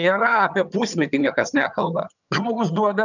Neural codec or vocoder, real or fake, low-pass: vocoder, 22.05 kHz, 80 mel bands, HiFi-GAN; fake; 7.2 kHz